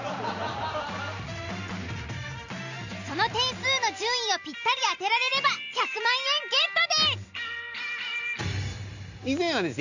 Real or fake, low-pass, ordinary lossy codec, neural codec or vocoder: real; 7.2 kHz; none; none